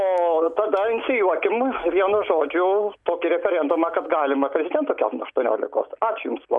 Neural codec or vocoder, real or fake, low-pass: none; real; 10.8 kHz